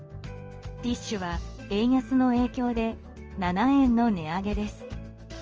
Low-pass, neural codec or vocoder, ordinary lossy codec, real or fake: 7.2 kHz; codec, 16 kHz in and 24 kHz out, 1 kbps, XY-Tokenizer; Opus, 24 kbps; fake